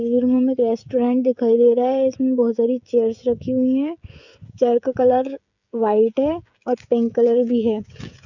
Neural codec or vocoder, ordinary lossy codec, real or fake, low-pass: codec, 16 kHz, 16 kbps, FreqCodec, smaller model; none; fake; 7.2 kHz